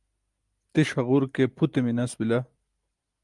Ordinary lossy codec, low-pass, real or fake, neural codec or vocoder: Opus, 32 kbps; 10.8 kHz; real; none